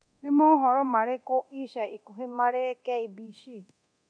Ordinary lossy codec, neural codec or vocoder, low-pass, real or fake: none; codec, 24 kHz, 0.9 kbps, DualCodec; 9.9 kHz; fake